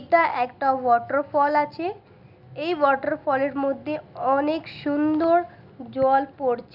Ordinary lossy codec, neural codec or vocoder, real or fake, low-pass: none; none; real; 5.4 kHz